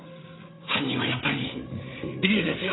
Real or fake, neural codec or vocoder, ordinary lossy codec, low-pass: fake; codec, 16 kHz, 8 kbps, FreqCodec, larger model; AAC, 16 kbps; 7.2 kHz